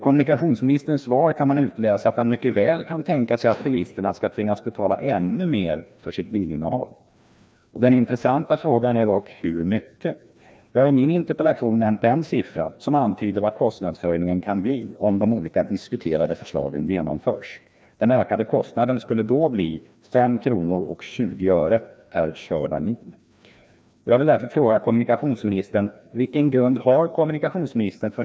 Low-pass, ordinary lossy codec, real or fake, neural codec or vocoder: none; none; fake; codec, 16 kHz, 1 kbps, FreqCodec, larger model